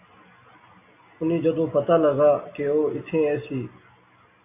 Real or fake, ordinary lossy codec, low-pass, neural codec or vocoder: real; AAC, 24 kbps; 3.6 kHz; none